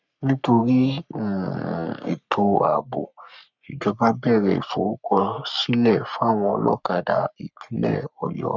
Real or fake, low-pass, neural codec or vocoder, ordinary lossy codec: fake; 7.2 kHz; codec, 44.1 kHz, 3.4 kbps, Pupu-Codec; none